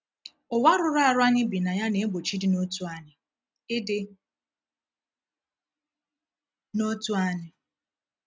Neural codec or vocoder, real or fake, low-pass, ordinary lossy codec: none; real; none; none